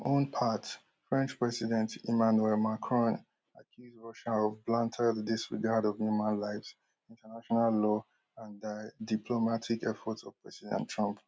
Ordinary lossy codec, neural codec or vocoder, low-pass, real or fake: none; none; none; real